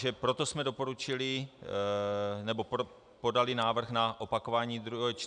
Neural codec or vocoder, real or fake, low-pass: none; real; 9.9 kHz